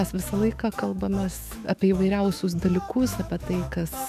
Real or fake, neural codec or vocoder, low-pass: fake; autoencoder, 48 kHz, 128 numbers a frame, DAC-VAE, trained on Japanese speech; 14.4 kHz